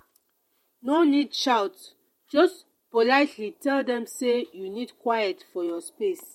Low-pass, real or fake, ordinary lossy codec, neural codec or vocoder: 19.8 kHz; fake; MP3, 64 kbps; vocoder, 48 kHz, 128 mel bands, Vocos